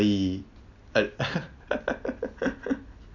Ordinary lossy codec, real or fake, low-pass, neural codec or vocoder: none; real; 7.2 kHz; none